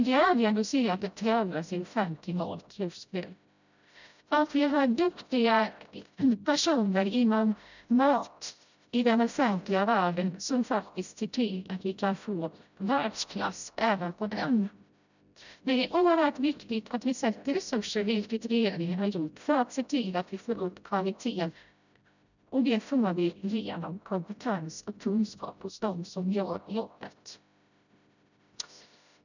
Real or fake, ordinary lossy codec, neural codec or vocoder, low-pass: fake; none; codec, 16 kHz, 0.5 kbps, FreqCodec, smaller model; 7.2 kHz